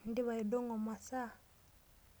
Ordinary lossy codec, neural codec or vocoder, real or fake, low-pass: none; none; real; none